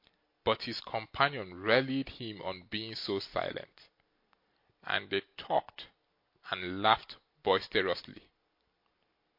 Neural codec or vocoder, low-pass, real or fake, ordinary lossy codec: none; 5.4 kHz; real; MP3, 32 kbps